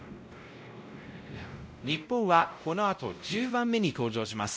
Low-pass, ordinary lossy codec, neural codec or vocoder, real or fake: none; none; codec, 16 kHz, 0.5 kbps, X-Codec, WavLM features, trained on Multilingual LibriSpeech; fake